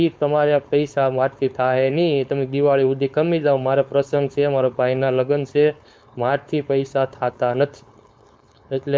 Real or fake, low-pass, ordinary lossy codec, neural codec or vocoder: fake; none; none; codec, 16 kHz, 4.8 kbps, FACodec